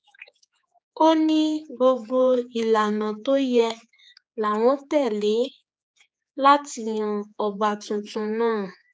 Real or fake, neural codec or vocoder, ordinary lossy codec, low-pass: fake; codec, 16 kHz, 4 kbps, X-Codec, HuBERT features, trained on general audio; none; none